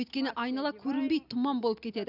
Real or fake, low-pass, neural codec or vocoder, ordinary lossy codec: real; 5.4 kHz; none; none